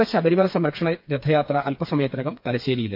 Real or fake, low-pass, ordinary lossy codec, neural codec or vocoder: fake; 5.4 kHz; MP3, 48 kbps; codec, 16 kHz, 4 kbps, FreqCodec, smaller model